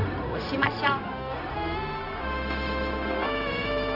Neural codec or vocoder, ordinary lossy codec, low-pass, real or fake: codec, 16 kHz, 0.4 kbps, LongCat-Audio-Codec; none; 5.4 kHz; fake